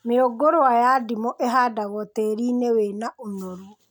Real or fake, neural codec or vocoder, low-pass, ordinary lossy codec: real; none; none; none